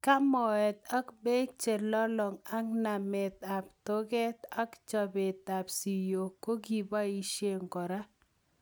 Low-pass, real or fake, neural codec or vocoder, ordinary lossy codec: none; real; none; none